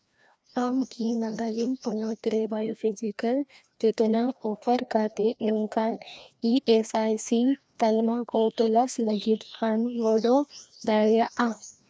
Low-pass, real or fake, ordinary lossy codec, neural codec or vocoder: none; fake; none; codec, 16 kHz, 1 kbps, FreqCodec, larger model